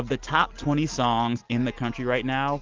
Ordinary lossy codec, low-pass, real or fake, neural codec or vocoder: Opus, 32 kbps; 7.2 kHz; real; none